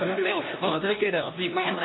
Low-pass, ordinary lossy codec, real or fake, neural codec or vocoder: 7.2 kHz; AAC, 16 kbps; fake; codec, 16 kHz, 1 kbps, X-Codec, HuBERT features, trained on LibriSpeech